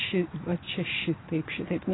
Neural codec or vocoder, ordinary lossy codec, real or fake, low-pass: none; AAC, 16 kbps; real; 7.2 kHz